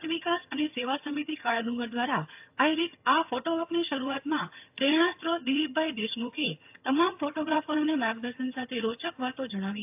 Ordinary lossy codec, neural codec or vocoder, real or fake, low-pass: AAC, 32 kbps; vocoder, 22.05 kHz, 80 mel bands, HiFi-GAN; fake; 3.6 kHz